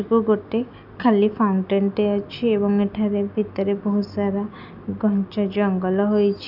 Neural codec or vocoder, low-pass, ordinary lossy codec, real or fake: none; 5.4 kHz; none; real